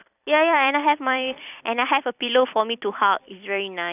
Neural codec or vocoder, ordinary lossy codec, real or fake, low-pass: vocoder, 44.1 kHz, 128 mel bands every 256 samples, BigVGAN v2; none; fake; 3.6 kHz